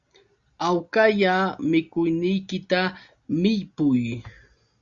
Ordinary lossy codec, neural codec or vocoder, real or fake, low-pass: Opus, 64 kbps; none; real; 7.2 kHz